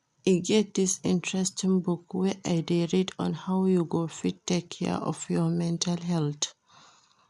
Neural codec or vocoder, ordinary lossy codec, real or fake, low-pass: none; none; real; none